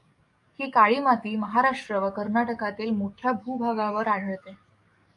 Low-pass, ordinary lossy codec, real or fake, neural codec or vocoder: 10.8 kHz; AAC, 64 kbps; fake; codec, 44.1 kHz, 7.8 kbps, DAC